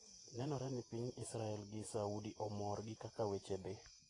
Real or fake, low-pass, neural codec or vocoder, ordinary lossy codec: fake; 10.8 kHz; vocoder, 48 kHz, 128 mel bands, Vocos; AAC, 32 kbps